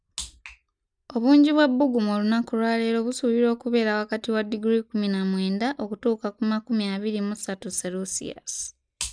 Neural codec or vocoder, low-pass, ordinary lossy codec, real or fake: none; 9.9 kHz; none; real